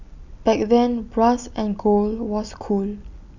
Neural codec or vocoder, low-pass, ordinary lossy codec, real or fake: none; 7.2 kHz; none; real